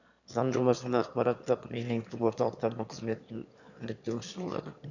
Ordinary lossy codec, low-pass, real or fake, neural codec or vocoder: none; 7.2 kHz; fake; autoencoder, 22.05 kHz, a latent of 192 numbers a frame, VITS, trained on one speaker